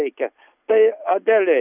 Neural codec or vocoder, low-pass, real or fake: none; 3.6 kHz; real